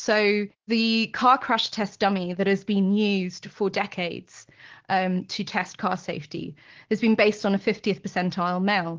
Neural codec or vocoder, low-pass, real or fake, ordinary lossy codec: none; 7.2 kHz; real; Opus, 16 kbps